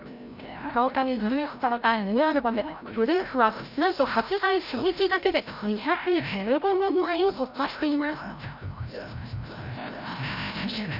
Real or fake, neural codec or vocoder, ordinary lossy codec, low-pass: fake; codec, 16 kHz, 0.5 kbps, FreqCodec, larger model; none; 5.4 kHz